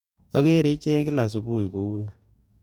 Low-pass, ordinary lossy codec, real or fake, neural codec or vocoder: 19.8 kHz; none; fake; codec, 44.1 kHz, 2.6 kbps, DAC